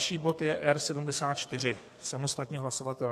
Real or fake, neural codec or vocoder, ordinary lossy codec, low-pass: fake; codec, 32 kHz, 1.9 kbps, SNAC; AAC, 64 kbps; 14.4 kHz